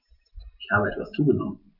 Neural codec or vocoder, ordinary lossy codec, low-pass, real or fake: none; none; 5.4 kHz; real